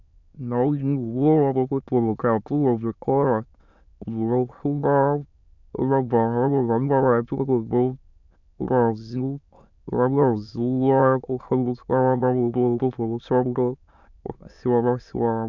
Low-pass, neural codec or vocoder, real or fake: 7.2 kHz; autoencoder, 22.05 kHz, a latent of 192 numbers a frame, VITS, trained on many speakers; fake